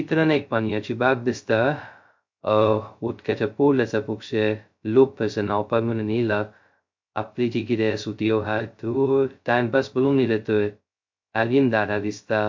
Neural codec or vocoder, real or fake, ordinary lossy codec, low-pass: codec, 16 kHz, 0.2 kbps, FocalCodec; fake; MP3, 48 kbps; 7.2 kHz